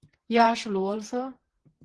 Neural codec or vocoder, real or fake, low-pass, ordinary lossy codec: vocoder, 44.1 kHz, 128 mel bands, Pupu-Vocoder; fake; 10.8 kHz; Opus, 16 kbps